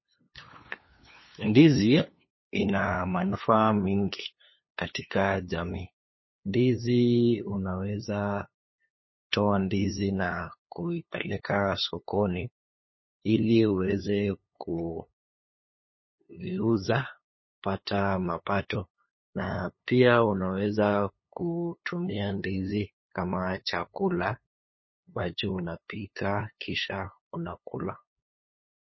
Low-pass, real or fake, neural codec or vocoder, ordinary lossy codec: 7.2 kHz; fake; codec, 16 kHz, 2 kbps, FunCodec, trained on LibriTTS, 25 frames a second; MP3, 24 kbps